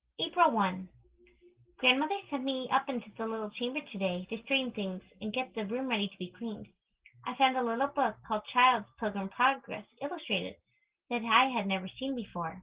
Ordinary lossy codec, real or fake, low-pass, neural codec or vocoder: Opus, 16 kbps; real; 3.6 kHz; none